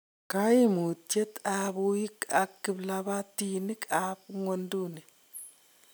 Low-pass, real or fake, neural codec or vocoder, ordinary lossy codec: none; real; none; none